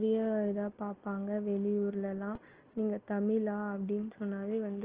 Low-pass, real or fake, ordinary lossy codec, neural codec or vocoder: 3.6 kHz; real; Opus, 16 kbps; none